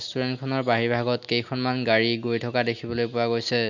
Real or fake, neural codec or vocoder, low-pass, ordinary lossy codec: real; none; 7.2 kHz; none